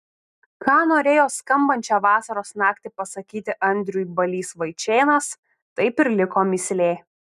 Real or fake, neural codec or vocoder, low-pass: real; none; 14.4 kHz